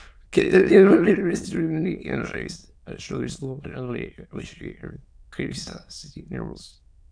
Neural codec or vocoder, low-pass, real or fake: autoencoder, 22.05 kHz, a latent of 192 numbers a frame, VITS, trained on many speakers; 9.9 kHz; fake